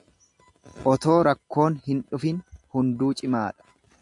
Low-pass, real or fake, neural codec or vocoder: 10.8 kHz; real; none